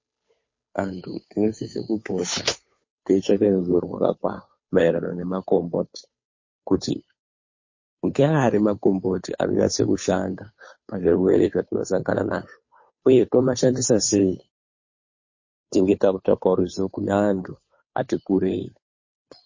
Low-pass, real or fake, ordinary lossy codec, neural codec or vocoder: 7.2 kHz; fake; MP3, 32 kbps; codec, 16 kHz, 2 kbps, FunCodec, trained on Chinese and English, 25 frames a second